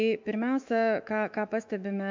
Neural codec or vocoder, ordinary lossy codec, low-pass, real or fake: autoencoder, 48 kHz, 128 numbers a frame, DAC-VAE, trained on Japanese speech; MP3, 64 kbps; 7.2 kHz; fake